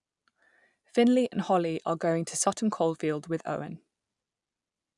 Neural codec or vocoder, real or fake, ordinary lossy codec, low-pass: none; real; none; 10.8 kHz